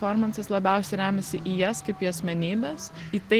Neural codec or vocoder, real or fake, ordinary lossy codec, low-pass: none; real; Opus, 16 kbps; 14.4 kHz